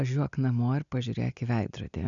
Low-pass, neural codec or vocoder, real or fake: 7.2 kHz; none; real